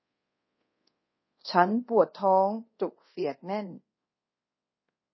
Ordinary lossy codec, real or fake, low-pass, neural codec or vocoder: MP3, 24 kbps; fake; 7.2 kHz; codec, 24 kHz, 0.5 kbps, DualCodec